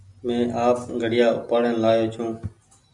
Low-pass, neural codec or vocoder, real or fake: 10.8 kHz; none; real